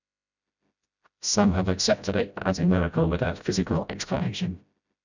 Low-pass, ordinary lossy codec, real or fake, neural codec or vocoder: 7.2 kHz; none; fake; codec, 16 kHz, 0.5 kbps, FreqCodec, smaller model